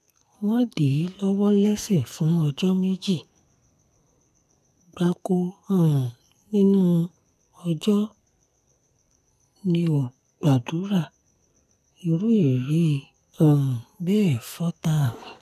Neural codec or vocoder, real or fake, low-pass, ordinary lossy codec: codec, 44.1 kHz, 2.6 kbps, SNAC; fake; 14.4 kHz; none